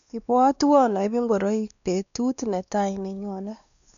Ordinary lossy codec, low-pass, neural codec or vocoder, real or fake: none; 7.2 kHz; codec, 16 kHz, 2 kbps, X-Codec, WavLM features, trained on Multilingual LibriSpeech; fake